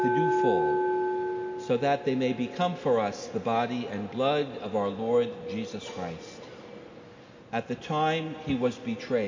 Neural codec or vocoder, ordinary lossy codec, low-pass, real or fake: none; MP3, 48 kbps; 7.2 kHz; real